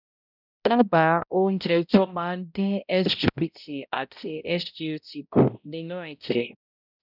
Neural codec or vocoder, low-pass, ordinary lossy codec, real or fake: codec, 16 kHz, 0.5 kbps, X-Codec, HuBERT features, trained on balanced general audio; 5.4 kHz; none; fake